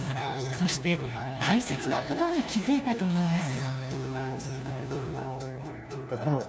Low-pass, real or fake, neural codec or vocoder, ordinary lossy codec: none; fake; codec, 16 kHz, 1 kbps, FunCodec, trained on LibriTTS, 50 frames a second; none